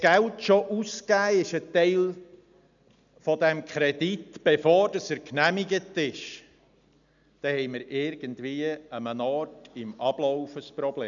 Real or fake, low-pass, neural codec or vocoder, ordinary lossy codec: real; 7.2 kHz; none; none